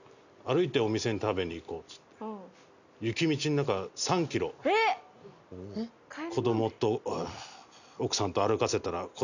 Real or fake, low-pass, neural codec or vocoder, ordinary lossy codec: real; 7.2 kHz; none; none